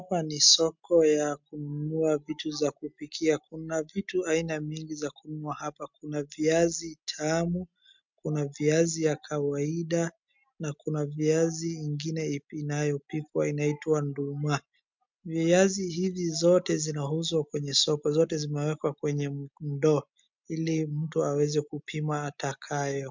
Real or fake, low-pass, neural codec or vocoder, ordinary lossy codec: real; 7.2 kHz; none; MP3, 64 kbps